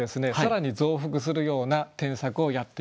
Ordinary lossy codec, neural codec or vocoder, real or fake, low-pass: none; none; real; none